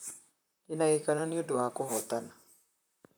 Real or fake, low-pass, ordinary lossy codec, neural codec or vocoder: fake; none; none; vocoder, 44.1 kHz, 128 mel bands, Pupu-Vocoder